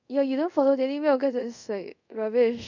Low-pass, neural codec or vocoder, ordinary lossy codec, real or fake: 7.2 kHz; codec, 24 kHz, 0.5 kbps, DualCodec; none; fake